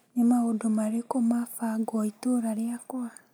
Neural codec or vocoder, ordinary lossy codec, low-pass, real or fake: none; none; none; real